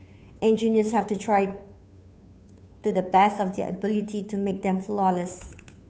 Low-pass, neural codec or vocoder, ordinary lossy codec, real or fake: none; codec, 16 kHz, 2 kbps, FunCodec, trained on Chinese and English, 25 frames a second; none; fake